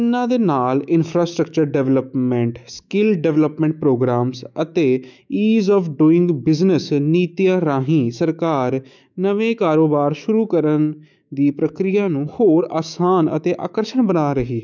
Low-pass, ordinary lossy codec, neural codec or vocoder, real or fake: 7.2 kHz; none; autoencoder, 48 kHz, 128 numbers a frame, DAC-VAE, trained on Japanese speech; fake